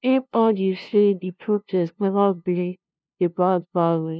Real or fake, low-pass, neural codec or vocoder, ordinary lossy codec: fake; none; codec, 16 kHz, 0.5 kbps, FunCodec, trained on LibriTTS, 25 frames a second; none